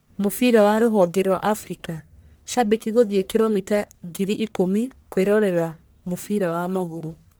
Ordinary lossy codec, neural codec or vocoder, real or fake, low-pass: none; codec, 44.1 kHz, 1.7 kbps, Pupu-Codec; fake; none